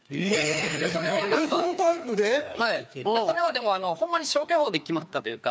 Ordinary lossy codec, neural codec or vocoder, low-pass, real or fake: none; codec, 16 kHz, 2 kbps, FreqCodec, larger model; none; fake